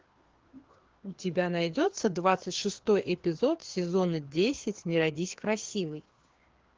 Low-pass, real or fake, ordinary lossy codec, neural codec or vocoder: 7.2 kHz; fake; Opus, 16 kbps; codec, 16 kHz, 2 kbps, FreqCodec, larger model